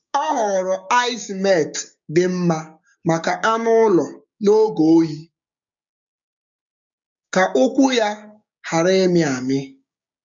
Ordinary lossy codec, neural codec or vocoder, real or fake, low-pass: MP3, 64 kbps; codec, 16 kHz, 6 kbps, DAC; fake; 7.2 kHz